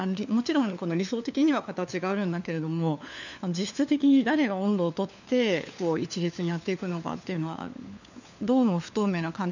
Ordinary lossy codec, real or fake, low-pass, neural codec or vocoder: none; fake; 7.2 kHz; codec, 16 kHz, 4 kbps, FunCodec, trained on LibriTTS, 50 frames a second